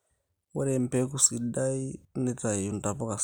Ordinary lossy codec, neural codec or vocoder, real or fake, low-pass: none; none; real; none